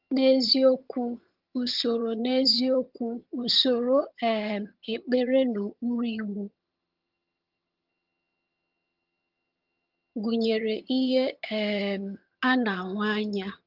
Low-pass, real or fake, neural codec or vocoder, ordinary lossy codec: 5.4 kHz; fake; vocoder, 22.05 kHz, 80 mel bands, HiFi-GAN; Opus, 24 kbps